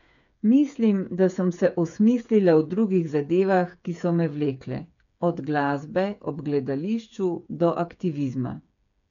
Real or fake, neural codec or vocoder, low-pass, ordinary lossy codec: fake; codec, 16 kHz, 8 kbps, FreqCodec, smaller model; 7.2 kHz; none